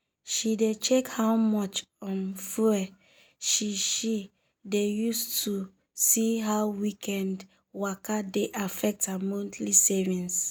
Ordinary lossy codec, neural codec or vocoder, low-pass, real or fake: none; none; none; real